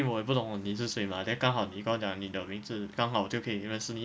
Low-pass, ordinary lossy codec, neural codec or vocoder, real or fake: none; none; none; real